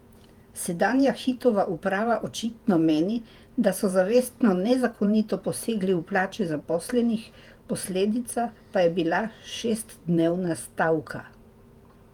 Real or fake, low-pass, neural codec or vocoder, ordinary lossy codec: fake; 19.8 kHz; vocoder, 48 kHz, 128 mel bands, Vocos; Opus, 32 kbps